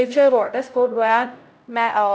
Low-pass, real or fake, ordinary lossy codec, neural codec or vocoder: none; fake; none; codec, 16 kHz, 0.5 kbps, X-Codec, HuBERT features, trained on LibriSpeech